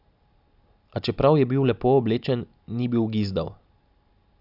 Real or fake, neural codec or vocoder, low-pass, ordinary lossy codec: real; none; 5.4 kHz; Opus, 64 kbps